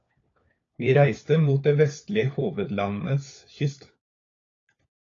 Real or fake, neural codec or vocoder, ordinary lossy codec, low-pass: fake; codec, 16 kHz, 4 kbps, FunCodec, trained on LibriTTS, 50 frames a second; AAC, 32 kbps; 7.2 kHz